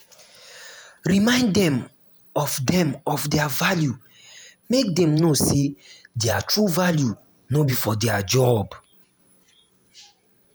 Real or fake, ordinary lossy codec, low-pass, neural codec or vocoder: fake; none; none; vocoder, 48 kHz, 128 mel bands, Vocos